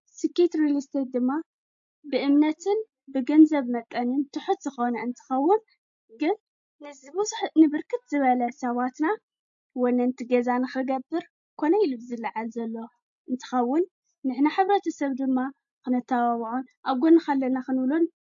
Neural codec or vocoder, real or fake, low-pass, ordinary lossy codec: none; real; 7.2 kHz; MP3, 48 kbps